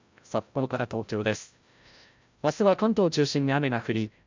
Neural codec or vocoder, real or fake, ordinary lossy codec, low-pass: codec, 16 kHz, 0.5 kbps, FreqCodec, larger model; fake; MP3, 64 kbps; 7.2 kHz